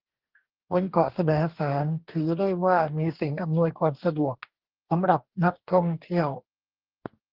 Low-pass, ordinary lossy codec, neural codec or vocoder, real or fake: 5.4 kHz; Opus, 16 kbps; codec, 44.1 kHz, 2.6 kbps, DAC; fake